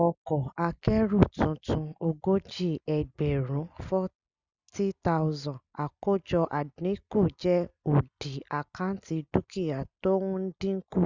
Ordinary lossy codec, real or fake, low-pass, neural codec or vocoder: Opus, 64 kbps; real; 7.2 kHz; none